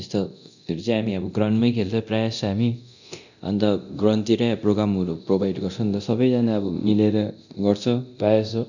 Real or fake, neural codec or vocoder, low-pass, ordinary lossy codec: fake; codec, 24 kHz, 0.9 kbps, DualCodec; 7.2 kHz; none